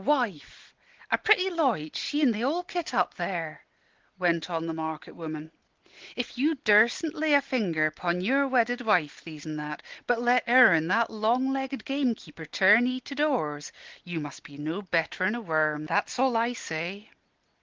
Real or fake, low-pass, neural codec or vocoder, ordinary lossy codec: real; 7.2 kHz; none; Opus, 16 kbps